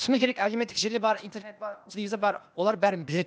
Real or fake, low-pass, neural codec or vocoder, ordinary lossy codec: fake; none; codec, 16 kHz, 0.8 kbps, ZipCodec; none